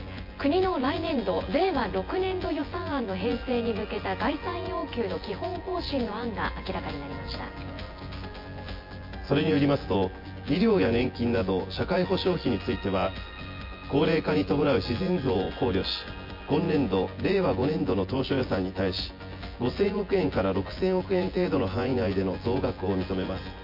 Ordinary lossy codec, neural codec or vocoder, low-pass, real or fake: MP3, 32 kbps; vocoder, 24 kHz, 100 mel bands, Vocos; 5.4 kHz; fake